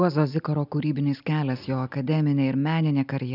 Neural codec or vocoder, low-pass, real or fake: none; 5.4 kHz; real